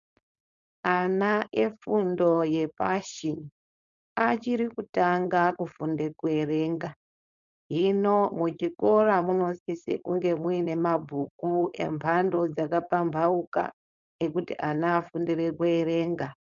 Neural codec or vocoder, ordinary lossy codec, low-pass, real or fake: codec, 16 kHz, 4.8 kbps, FACodec; MP3, 96 kbps; 7.2 kHz; fake